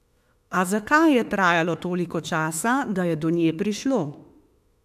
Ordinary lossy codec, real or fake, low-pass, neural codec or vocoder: AAC, 96 kbps; fake; 14.4 kHz; autoencoder, 48 kHz, 32 numbers a frame, DAC-VAE, trained on Japanese speech